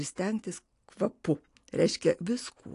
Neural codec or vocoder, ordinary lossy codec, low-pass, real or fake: none; AAC, 48 kbps; 10.8 kHz; real